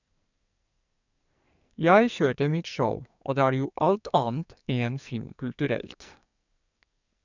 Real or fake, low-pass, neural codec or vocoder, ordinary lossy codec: fake; 7.2 kHz; codec, 44.1 kHz, 2.6 kbps, SNAC; none